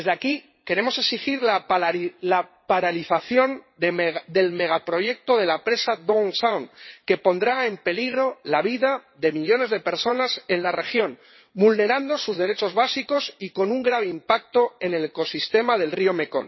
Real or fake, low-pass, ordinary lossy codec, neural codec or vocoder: fake; 7.2 kHz; MP3, 24 kbps; vocoder, 22.05 kHz, 80 mel bands, WaveNeXt